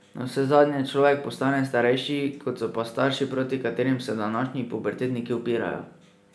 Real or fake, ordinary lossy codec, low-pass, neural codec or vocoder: real; none; none; none